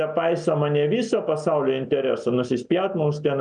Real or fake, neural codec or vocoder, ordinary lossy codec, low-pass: real; none; MP3, 96 kbps; 9.9 kHz